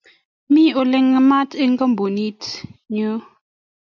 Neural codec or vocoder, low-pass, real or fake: none; 7.2 kHz; real